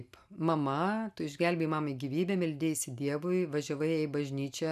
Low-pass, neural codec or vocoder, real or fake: 14.4 kHz; none; real